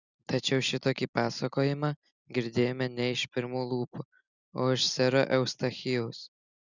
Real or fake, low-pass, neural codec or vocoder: real; 7.2 kHz; none